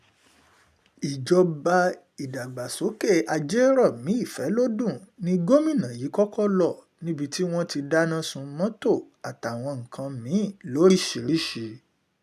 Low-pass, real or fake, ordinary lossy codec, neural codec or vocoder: 14.4 kHz; real; none; none